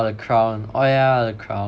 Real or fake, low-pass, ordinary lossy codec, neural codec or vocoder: real; none; none; none